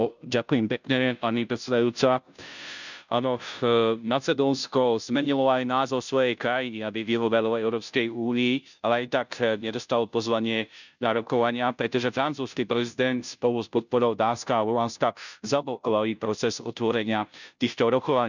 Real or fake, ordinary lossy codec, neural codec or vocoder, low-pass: fake; none; codec, 16 kHz, 0.5 kbps, FunCodec, trained on Chinese and English, 25 frames a second; 7.2 kHz